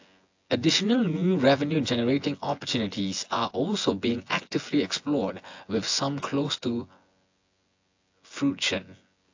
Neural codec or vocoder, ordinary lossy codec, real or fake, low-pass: vocoder, 24 kHz, 100 mel bands, Vocos; AAC, 48 kbps; fake; 7.2 kHz